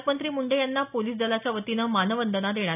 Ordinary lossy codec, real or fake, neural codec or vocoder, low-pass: none; real; none; 3.6 kHz